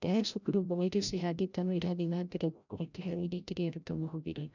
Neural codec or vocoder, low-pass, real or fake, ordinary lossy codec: codec, 16 kHz, 0.5 kbps, FreqCodec, larger model; 7.2 kHz; fake; none